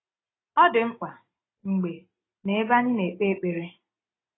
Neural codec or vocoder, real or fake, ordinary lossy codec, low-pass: none; real; AAC, 16 kbps; 7.2 kHz